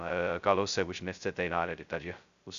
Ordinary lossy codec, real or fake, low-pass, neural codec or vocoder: Opus, 64 kbps; fake; 7.2 kHz; codec, 16 kHz, 0.2 kbps, FocalCodec